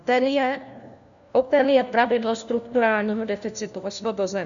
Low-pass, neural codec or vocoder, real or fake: 7.2 kHz; codec, 16 kHz, 1 kbps, FunCodec, trained on LibriTTS, 50 frames a second; fake